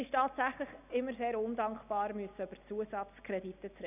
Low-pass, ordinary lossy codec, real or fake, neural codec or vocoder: 3.6 kHz; none; real; none